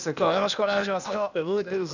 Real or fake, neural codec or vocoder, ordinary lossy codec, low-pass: fake; codec, 16 kHz, 0.8 kbps, ZipCodec; none; 7.2 kHz